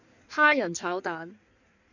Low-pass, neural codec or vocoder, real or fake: 7.2 kHz; codec, 16 kHz in and 24 kHz out, 1.1 kbps, FireRedTTS-2 codec; fake